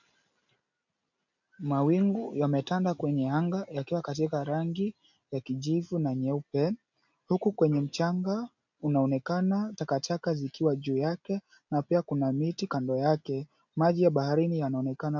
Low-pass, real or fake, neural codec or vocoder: 7.2 kHz; real; none